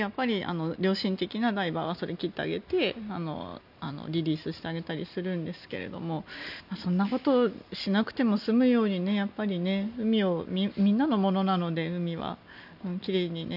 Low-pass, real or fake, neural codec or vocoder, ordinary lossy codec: 5.4 kHz; real; none; none